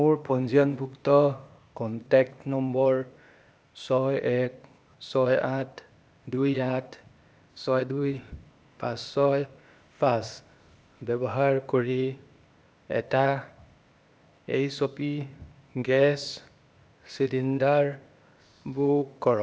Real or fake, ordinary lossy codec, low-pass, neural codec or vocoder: fake; none; none; codec, 16 kHz, 0.8 kbps, ZipCodec